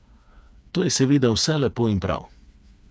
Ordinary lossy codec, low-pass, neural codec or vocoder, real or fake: none; none; codec, 16 kHz, 4 kbps, FreqCodec, smaller model; fake